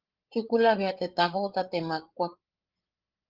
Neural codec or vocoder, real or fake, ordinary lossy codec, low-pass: codec, 16 kHz, 8 kbps, FreqCodec, larger model; fake; Opus, 16 kbps; 5.4 kHz